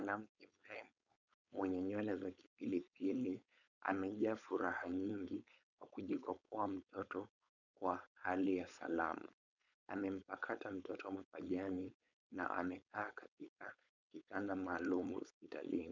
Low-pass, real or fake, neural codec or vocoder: 7.2 kHz; fake; codec, 16 kHz, 4.8 kbps, FACodec